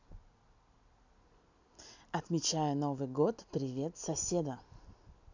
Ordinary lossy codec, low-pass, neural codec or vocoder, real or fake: none; 7.2 kHz; none; real